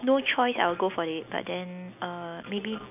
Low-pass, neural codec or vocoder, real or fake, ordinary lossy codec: 3.6 kHz; none; real; none